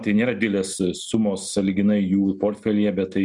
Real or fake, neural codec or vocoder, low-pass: real; none; 10.8 kHz